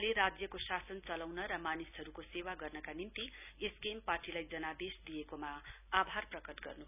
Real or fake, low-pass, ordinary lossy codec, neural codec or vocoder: real; 3.6 kHz; none; none